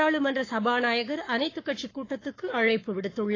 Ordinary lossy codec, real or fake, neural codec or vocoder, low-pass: AAC, 32 kbps; fake; codec, 44.1 kHz, 7.8 kbps, Pupu-Codec; 7.2 kHz